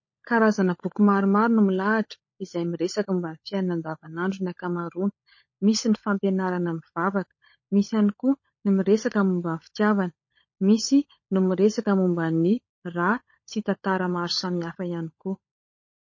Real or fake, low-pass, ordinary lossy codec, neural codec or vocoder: fake; 7.2 kHz; MP3, 32 kbps; codec, 16 kHz, 16 kbps, FunCodec, trained on LibriTTS, 50 frames a second